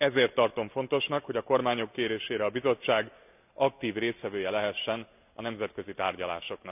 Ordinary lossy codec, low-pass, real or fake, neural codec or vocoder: none; 3.6 kHz; real; none